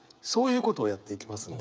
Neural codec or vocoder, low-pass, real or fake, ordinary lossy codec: codec, 16 kHz, 16 kbps, FreqCodec, smaller model; none; fake; none